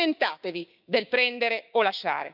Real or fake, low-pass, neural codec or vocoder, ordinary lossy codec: fake; 5.4 kHz; codec, 16 kHz, 6 kbps, DAC; none